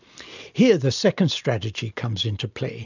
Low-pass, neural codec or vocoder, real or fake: 7.2 kHz; none; real